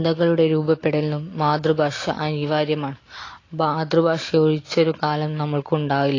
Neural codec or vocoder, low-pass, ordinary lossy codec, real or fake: none; 7.2 kHz; AAC, 32 kbps; real